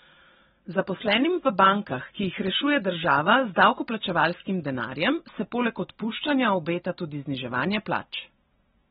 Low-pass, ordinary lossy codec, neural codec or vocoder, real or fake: 19.8 kHz; AAC, 16 kbps; none; real